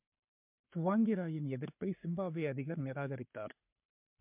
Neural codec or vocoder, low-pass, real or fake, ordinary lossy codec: codec, 44.1 kHz, 3.4 kbps, Pupu-Codec; 3.6 kHz; fake; MP3, 32 kbps